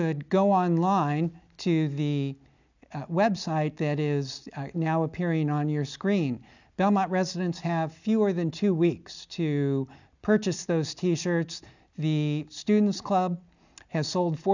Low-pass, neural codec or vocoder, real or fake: 7.2 kHz; none; real